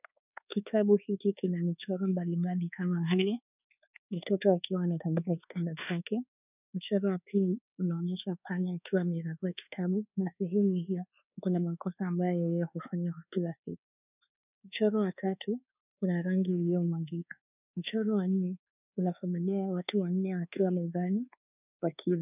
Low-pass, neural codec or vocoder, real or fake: 3.6 kHz; codec, 24 kHz, 1.2 kbps, DualCodec; fake